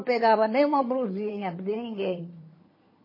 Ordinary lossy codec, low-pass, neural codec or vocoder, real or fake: MP3, 24 kbps; 5.4 kHz; vocoder, 22.05 kHz, 80 mel bands, HiFi-GAN; fake